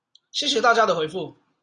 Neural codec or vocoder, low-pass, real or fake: none; 9.9 kHz; real